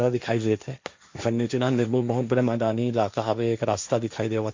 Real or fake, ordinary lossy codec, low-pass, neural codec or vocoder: fake; MP3, 64 kbps; 7.2 kHz; codec, 16 kHz, 1.1 kbps, Voila-Tokenizer